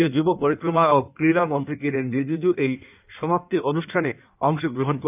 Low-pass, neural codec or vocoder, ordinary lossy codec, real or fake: 3.6 kHz; codec, 16 kHz in and 24 kHz out, 1.1 kbps, FireRedTTS-2 codec; none; fake